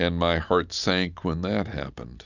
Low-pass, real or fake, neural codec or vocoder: 7.2 kHz; real; none